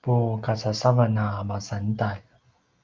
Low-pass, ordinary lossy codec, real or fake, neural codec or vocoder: 7.2 kHz; Opus, 32 kbps; real; none